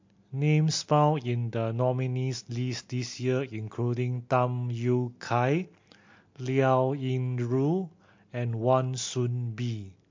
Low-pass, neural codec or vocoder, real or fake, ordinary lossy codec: 7.2 kHz; none; real; MP3, 48 kbps